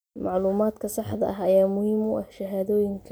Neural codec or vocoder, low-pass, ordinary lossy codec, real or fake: none; none; none; real